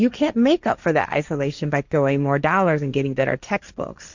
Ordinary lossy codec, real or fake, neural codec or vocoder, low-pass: Opus, 64 kbps; fake; codec, 16 kHz, 1.1 kbps, Voila-Tokenizer; 7.2 kHz